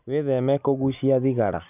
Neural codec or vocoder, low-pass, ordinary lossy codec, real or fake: none; 3.6 kHz; none; real